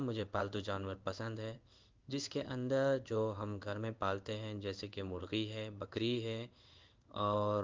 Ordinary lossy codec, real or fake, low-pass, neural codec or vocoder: Opus, 32 kbps; fake; 7.2 kHz; codec, 16 kHz in and 24 kHz out, 1 kbps, XY-Tokenizer